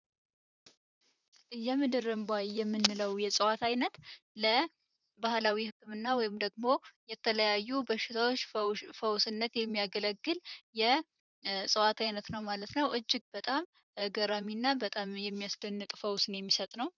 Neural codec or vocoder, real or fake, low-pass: vocoder, 44.1 kHz, 128 mel bands, Pupu-Vocoder; fake; 7.2 kHz